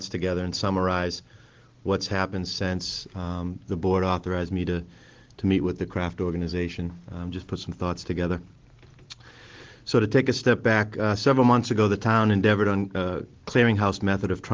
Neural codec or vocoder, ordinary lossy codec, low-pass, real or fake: none; Opus, 16 kbps; 7.2 kHz; real